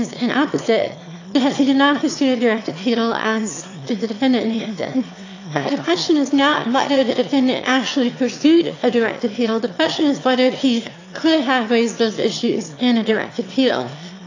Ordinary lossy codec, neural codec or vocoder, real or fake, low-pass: AAC, 48 kbps; autoencoder, 22.05 kHz, a latent of 192 numbers a frame, VITS, trained on one speaker; fake; 7.2 kHz